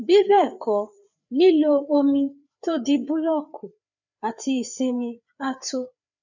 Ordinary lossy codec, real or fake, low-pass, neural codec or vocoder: none; fake; 7.2 kHz; codec, 16 kHz, 4 kbps, FreqCodec, larger model